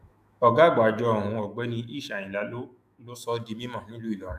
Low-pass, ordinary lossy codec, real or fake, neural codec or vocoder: 14.4 kHz; none; fake; autoencoder, 48 kHz, 128 numbers a frame, DAC-VAE, trained on Japanese speech